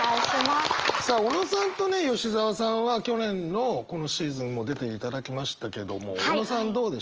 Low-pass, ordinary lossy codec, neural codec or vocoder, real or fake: 7.2 kHz; Opus, 24 kbps; none; real